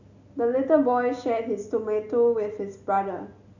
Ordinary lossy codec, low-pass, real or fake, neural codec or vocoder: none; 7.2 kHz; real; none